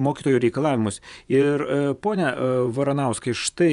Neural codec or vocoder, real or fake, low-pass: vocoder, 24 kHz, 100 mel bands, Vocos; fake; 10.8 kHz